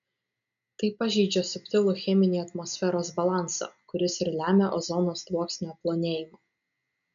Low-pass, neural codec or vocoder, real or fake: 7.2 kHz; none; real